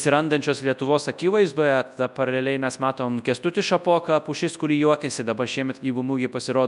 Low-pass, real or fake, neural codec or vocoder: 10.8 kHz; fake; codec, 24 kHz, 0.9 kbps, WavTokenizer, large speech release